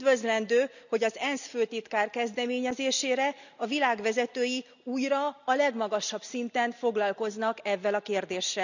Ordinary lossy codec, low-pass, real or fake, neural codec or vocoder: none; 7.2 kHz; real; none